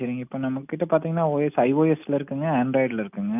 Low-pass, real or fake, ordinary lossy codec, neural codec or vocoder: 3.6 kHz; real; none; none